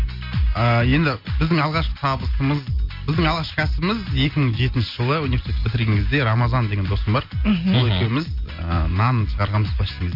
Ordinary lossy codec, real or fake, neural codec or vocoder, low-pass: MP3, 32 kbps; real; none; 5.4 kHz